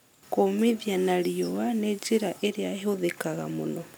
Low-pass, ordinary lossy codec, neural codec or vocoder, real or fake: none; none; none; real